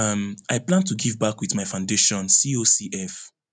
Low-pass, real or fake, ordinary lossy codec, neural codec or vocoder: 9.9 kHz; real; none; none